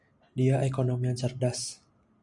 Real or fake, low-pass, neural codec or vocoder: real; 10.8 kHz; none